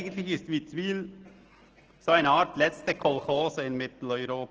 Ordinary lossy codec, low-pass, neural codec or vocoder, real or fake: Opus, 16 kbps; 7.2 kHz; none; real